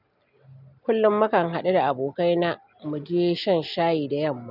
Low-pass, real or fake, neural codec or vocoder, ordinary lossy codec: 5.4 kHz; real; none; none